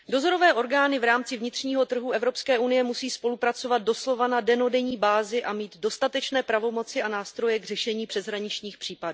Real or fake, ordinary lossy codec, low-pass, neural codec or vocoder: real; none; none; none